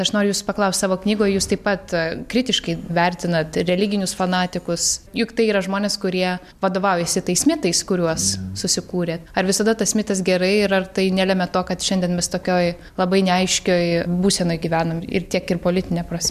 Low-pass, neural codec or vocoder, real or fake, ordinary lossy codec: 14.4 kHz; none; real; MP3, 96 kbps